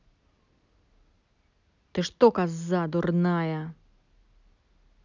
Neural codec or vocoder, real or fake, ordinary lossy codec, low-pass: none; real; none; 7.2 kHz